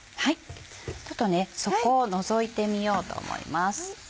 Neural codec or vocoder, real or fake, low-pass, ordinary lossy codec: none; real; none; none